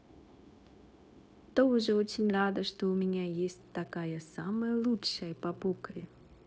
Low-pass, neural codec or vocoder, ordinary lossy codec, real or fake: none; codec, 16 kHz, 0.9 kbps, LongCat-Audio-Codec; none; fake